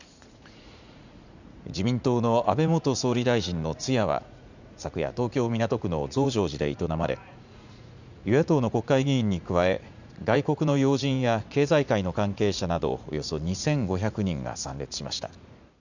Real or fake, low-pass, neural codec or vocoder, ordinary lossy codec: fake; 7.2 kHz; vocoder, 44.1 kHz, 80 mel bands, Vocos; none